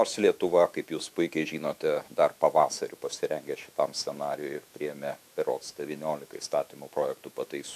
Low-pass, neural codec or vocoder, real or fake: 14.4 kHz; autoencoder, 48 kHz, 128 numbers a frame, DAC-VAE, trained on Japanese speech; fake